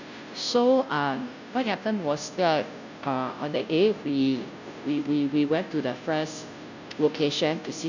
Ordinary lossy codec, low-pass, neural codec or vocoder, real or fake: none; 7.2 kHz; codec, 16 kHz, 0.5 kbps, FunCodec, trained on Chinese and English, 25 frames a second; fake